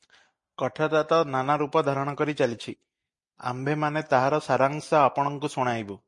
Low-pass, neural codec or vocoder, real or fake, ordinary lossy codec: 10.8 kHz; none; real; MP3, 48 kbps